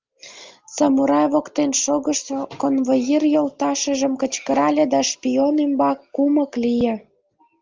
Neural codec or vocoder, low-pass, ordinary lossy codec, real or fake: none; 7.2 kHz; Opus, 32 kbps; real